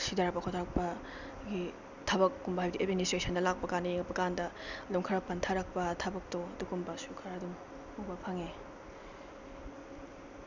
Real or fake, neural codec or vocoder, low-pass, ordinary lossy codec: real; none; 7.2 kHz; none